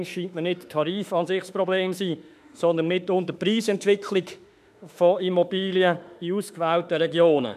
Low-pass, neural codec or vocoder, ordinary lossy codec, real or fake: 14.4 kHz; autoencoder, 48 kHz, 32 numbers a frame, DAC-VAE, trained on Japanese speech; none; fake